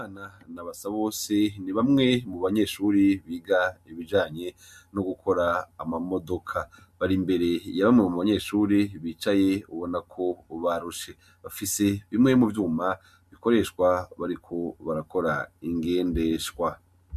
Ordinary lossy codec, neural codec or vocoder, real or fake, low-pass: MP3, 96 kbps; none; real; 14.4 kHz